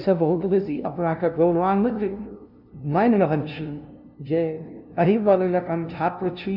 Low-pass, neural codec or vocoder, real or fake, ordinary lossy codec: 5.4 kHz; codec, 16 kHz, 0.5 kbps, FunCodec, trained on LibriTTS, 25 frames a second; fake; none